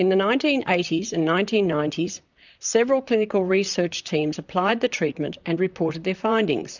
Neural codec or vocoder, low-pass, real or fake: vocoder, 44.1 kHz, 128 mel bands, Pupu-Vocoder; 7.2 kHz; fake